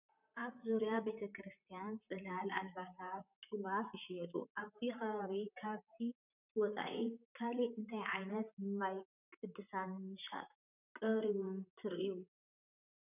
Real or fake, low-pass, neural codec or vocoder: fake; 3.6 kHz; vocoder, 44.1 kHz, 128 mel bands, Pupu-Vocoder